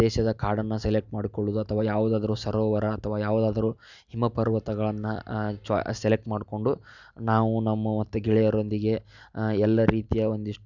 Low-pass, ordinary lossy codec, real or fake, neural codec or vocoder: 7.2 kHz; none; real; none